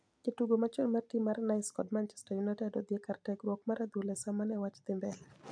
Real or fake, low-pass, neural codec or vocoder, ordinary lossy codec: fake; 9.9 kHz; vocoder, 44.1 kHz, 128 mel bands every 256 samples, BigVGAN v2; none